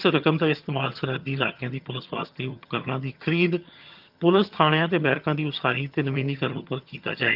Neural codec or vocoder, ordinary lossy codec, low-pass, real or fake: vocoder, 22.05 kHz, 80 mel bands, HiFi-GAN; Opus, 24 kbps; 5.4 kHz; fake